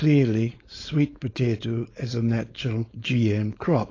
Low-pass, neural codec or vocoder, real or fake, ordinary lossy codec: 7.2 kHz; codec, 16 kHz, 4.8 kbps, FACodec; fake; AAC, 32 kbps